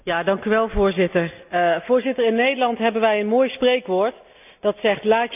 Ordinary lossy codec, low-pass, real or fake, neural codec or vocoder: none; 3.6 kHz; real; none